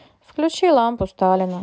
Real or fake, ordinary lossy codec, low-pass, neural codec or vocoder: real; none; none; none